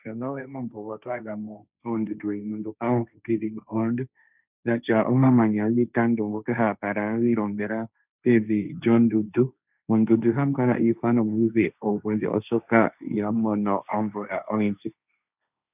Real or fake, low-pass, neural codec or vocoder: fake; 3.6 kHz; codec, 16 kHz, 1.1 kbps, Voila-Tokenizer